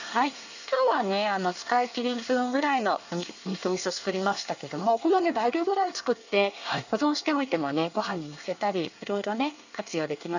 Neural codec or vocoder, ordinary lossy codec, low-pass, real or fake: codec, 24 kHz, 1 kbps, SNAC; none; 7.2 kHz; fake